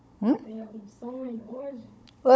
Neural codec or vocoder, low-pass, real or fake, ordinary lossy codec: codec, 16 kHz, 16 kbps, FunCodec, trained on Chinese and English, 50 frames a second; none; fake; none